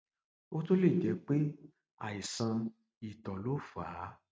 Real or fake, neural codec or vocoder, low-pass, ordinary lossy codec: real; none; none; none